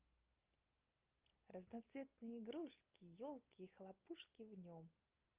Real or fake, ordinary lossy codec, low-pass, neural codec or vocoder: real; Opus, 24 kbps; 3.6 kHz; none